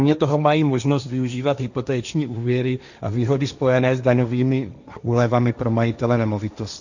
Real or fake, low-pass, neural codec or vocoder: fake; 7.2 kHz; codec, 16 kHz, 1.1 kbps, Voila-Tokenizer